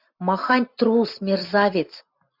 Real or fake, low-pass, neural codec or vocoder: fake; 5.4 kHz; vocoder, 44.1 kHz, 128 mel bands every 256 samples, BigVGAN v2